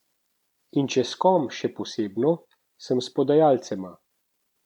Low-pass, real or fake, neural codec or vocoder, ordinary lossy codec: 19.8 kHz; fake; vocoder, 44.1 kHz, 128 mel bands every 256 samples, BigVGAN v2; none